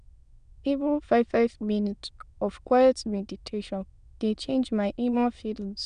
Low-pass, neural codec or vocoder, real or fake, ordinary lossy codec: 9.9 kHz; autoencoder, 22.05 kHz, a latent of 192 numbers a frame, VITS, trained on many speakers; fake; MP3, 96 kbps